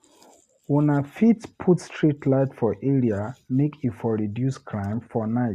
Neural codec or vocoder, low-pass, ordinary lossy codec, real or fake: none; 14.4 kHz; Opus, 64 kbps; real